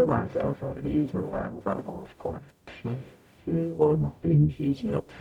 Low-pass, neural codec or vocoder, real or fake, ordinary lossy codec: 19.8 kHz; codec, 44.1 kHz, 0.9 kbps, DAC; fake; MP3, 96 kbps